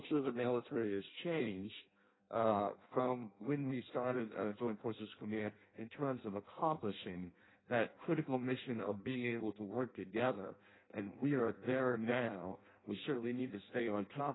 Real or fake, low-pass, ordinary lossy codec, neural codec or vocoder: fake; 7.2 kHz; AAC, 16 kbps; codec, 16 kHz in and 24 kHz out, 0.6 kbps, FireRedTTS-2 codec